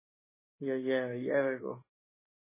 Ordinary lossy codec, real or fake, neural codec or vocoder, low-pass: MP3, 16 kbps; fake; vocoder, 44.1 kHz, 128 mel bands every 512 samples, BigVGAN v2; 3.6 kHz